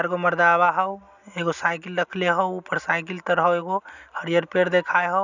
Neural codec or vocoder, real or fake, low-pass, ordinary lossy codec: none; real; 7.2 kHz; none